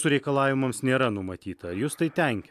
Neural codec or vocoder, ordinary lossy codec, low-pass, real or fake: none; AAC, 96 kbps; 14.4 kHz; real